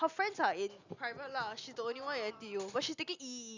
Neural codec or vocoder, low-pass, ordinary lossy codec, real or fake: none; 7.2 kHz; Opus, 64 kbps; real